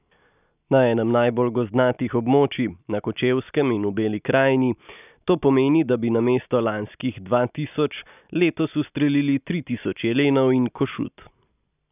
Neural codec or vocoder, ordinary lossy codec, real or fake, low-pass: none; none; real; 3.6 kHz